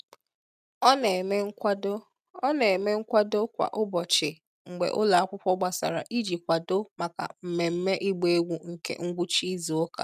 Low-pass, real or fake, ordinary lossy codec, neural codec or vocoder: 14.4 kHz; real; none; none